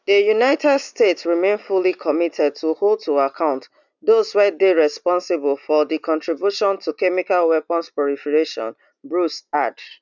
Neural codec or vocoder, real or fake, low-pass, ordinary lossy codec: none; real; 7.2 kHz; none